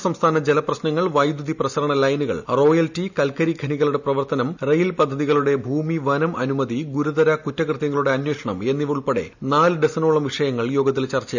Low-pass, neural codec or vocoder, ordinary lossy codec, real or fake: 7.2 kHz; none; none; real